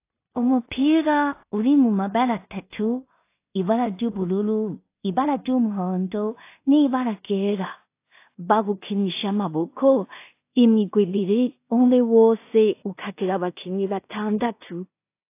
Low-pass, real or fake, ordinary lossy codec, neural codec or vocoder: 3.6 kHz; fake; AAC, 24 kbps; codec, 16 kHz in and 24 kHz out, 0.4 kbps, LongCat-Audio-Codec, two codebook decoder